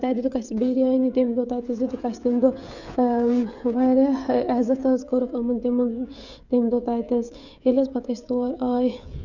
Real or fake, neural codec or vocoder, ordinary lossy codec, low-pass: fake; codec, 16 kHz, 16 kbps, FreqCodec, smaller model; none; 7.2 kHz